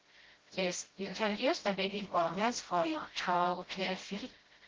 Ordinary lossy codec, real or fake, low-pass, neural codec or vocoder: Opus, 16 kbps; fake; 7.2 kHz; codec, 16 kHz, 0.5 kbps, FreqCodec, smaller model